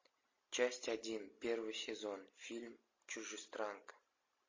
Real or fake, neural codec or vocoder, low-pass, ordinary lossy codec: real; none; 7.2 kHz; MP3, 32 kbps